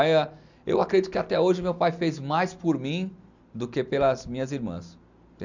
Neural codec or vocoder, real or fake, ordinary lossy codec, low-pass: none; real; none; 7.2 kHz